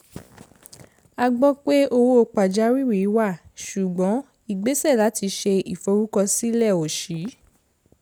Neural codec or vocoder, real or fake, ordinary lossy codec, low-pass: none; real; none; none